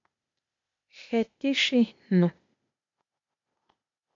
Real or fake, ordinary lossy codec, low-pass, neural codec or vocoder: fake; MP3, 48 kbps; 7.2 kHz; codec, 16 kHz, 0.8 kbps, ZipCodec